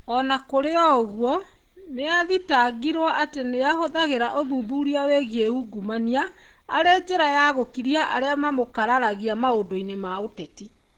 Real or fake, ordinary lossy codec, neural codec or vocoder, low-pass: fake; Opus, 16 kbps; codec, 44.1 kHz, 7.8 kbps, DAC; 19.8 kHz